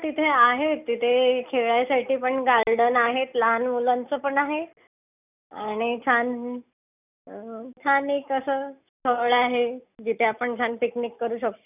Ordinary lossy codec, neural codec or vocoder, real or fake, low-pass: none; none; real; 3.6 kHz